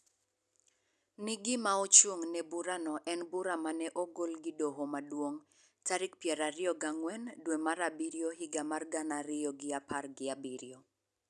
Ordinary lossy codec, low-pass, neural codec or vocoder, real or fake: none; none; none; real